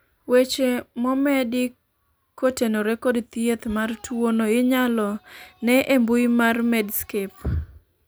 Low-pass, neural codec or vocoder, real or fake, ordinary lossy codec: none; none; real; none